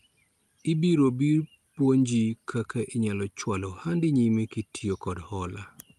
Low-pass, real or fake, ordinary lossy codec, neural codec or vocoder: 14.4 kHz; real; Opus, 32 kbps; none